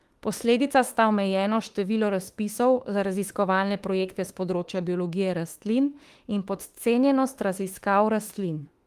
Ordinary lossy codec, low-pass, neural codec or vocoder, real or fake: Opus, 24 kbps; 14.4 kHz; autoencoder, 48 kHz, 32 numbers a frame, DAC-VAE, trained on Japanese speech; fake